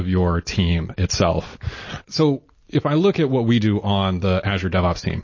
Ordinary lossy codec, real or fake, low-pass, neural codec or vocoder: MP3, 32 kbps; real; 7.2 kHz; none